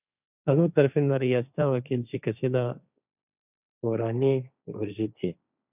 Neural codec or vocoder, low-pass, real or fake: codec, 16 kHz, 1.1 kbps, Voila-Tokenizer; 3.6 kHz; fake